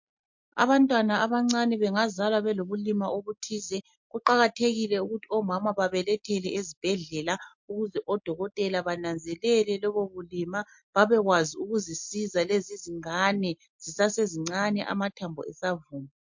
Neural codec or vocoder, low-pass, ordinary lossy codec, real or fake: none; 7.2 kHz; MP3, 48 kbps; real